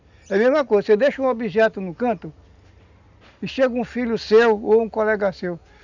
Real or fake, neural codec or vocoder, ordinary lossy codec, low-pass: real; none; none; 7.2 kHz